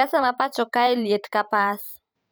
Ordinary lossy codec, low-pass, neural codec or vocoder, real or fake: none; none; vocoder, 44.1 kHz, 128 mel bands every 512 samples, BigVGAN v2; fake